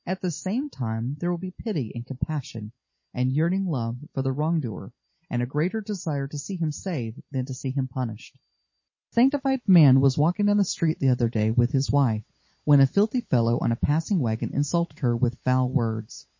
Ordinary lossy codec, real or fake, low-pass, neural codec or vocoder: MP3, 32 kbps; real; 7.2 kHz; none